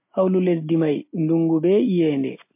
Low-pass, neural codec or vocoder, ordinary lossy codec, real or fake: 3.6 kHz; none; MP3, 24 kbps; real